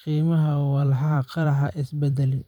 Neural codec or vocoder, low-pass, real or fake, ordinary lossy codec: vocoder, 44.1 kHz, 128 mel bands every 512 samples, BigVGAN v2; 19.8 kHz; fake; none